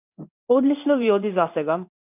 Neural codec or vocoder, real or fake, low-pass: codec, 16 kHz in and 24 kHz out, 1 kbps, XY-Tokenizer; fake; 3.6 kHz